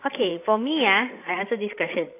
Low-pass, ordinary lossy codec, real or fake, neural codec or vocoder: 3.6 kHz; AAC, 24 kbps; fake; codec, 16 kHz, 16 kbps, FreqCodec, larger model